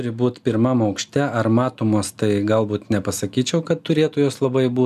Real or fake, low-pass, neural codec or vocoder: real; 14.4 kHz; none